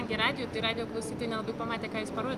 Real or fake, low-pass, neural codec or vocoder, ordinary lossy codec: real; 14.4 kHz; none; Opus, 32 kbps